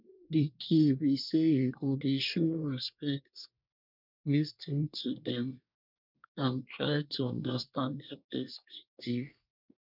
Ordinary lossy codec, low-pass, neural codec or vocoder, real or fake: none; 5.4 kHz; codec, 24 kHz, 1 kbps, SNAC; fake